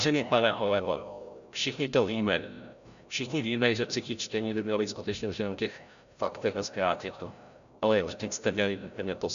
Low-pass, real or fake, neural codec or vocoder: 7.2 kHz; fake; codec, 16 kHz, 0.5 kbps, FreqCodec, larger model